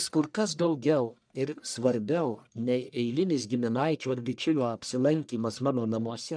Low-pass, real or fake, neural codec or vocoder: 9.9 kHz; fake; codec, 44.1 kHz, 1.7 kbps, Pupu-Codec